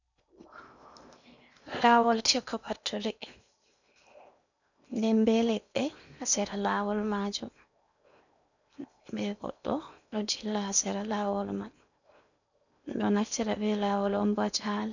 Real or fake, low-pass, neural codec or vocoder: fake; 7.2 kHz; codec, 16 kHz in and 24 kHz out, 0.8 kbps, FocalCodec, streaming, 65536 codes